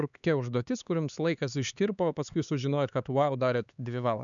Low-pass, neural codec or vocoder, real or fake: 7.2 kHz; codec, 16 kHz, 4 kbps, X-Codec, HuBERT features, trained on LibriSpeech; fake